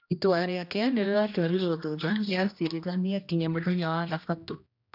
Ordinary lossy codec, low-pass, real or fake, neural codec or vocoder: none; 5.4 kHz; fake; codec, 16 kHz, 1 kbps, X-Codec, HuBERT features, trained on general audio